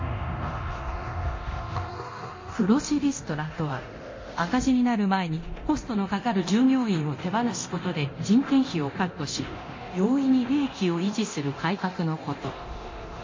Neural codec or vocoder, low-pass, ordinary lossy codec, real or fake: codec, 24 kHz, 0.9 kbps, DualCodec; 7.2 kHz; MP3, 32 kbps; fake